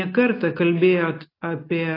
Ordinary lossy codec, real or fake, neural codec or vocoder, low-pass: AAC, 24 kbps; real; none; 5.4 kHz